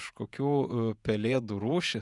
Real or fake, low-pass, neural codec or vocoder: fake; 10.8 kHz; vocoder, 48 kHz, 128 mel bands, Vocos